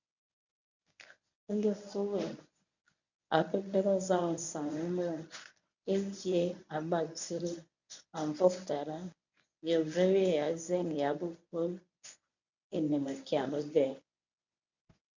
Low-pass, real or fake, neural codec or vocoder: 7.2 kHz; fake; codec, 24 kHz, 0.9 kbps, WavTokenizer, medium speech release version 1